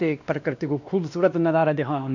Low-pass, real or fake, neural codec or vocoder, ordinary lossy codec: 7.2 kHz; fake; codec, 16 kHz, 1 kbps, X-Codec, WavLM features, trained on Multilingual LibriSpeech; none